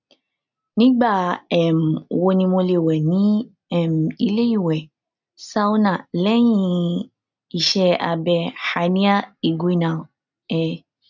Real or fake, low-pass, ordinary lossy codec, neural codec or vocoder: real; 7.2 kHz; none; none